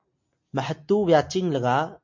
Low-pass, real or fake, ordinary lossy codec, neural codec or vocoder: 7.2 kHz; real; MP3, 48 kbps; none